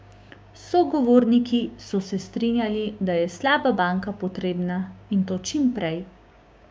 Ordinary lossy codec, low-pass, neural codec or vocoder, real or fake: none; none; codec, 16 kHz, 6 kbps, DAC; fake